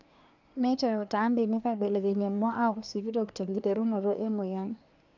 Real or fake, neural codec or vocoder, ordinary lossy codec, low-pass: fake; codec, 24 kHz, 1 kbps, SNAC; none; 7.2 kHz